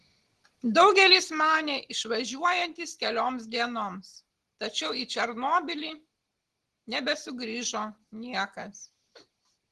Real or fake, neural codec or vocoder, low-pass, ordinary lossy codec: real; none; 10.8 kHz; Opus, 16 kbps